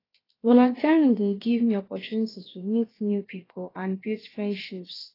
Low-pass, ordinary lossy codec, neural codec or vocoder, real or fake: 5.4 kHz; AAC, 24 kbps; codec, 16 kHz, about 1 kbps, DyCAST, with the encoder's durations; fake